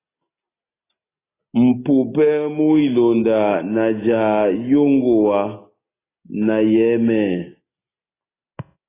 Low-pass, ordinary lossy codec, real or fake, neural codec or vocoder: 3.6 kHz; AAC, 16 kbps; real; none